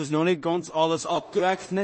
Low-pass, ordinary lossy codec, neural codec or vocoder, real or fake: 9.9 kHz; MP3, 32 kbps; codec, 16 kHz in and 24 kHz out, 0.4 kbps, LongCat-Audio-Codec, two codebook decoder; fake